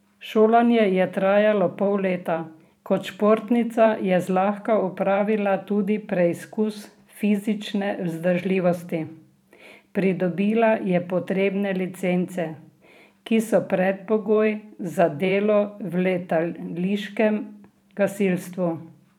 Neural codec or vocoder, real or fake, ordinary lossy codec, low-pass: vocoder, 44.1 kHz, 128 mel bands every 512 samples, BigVGAN v2; fake; none; 19.8 kHz